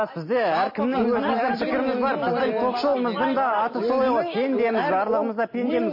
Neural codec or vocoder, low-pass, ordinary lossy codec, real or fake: none; 5.4 kHz; MP3, 24 kbps; real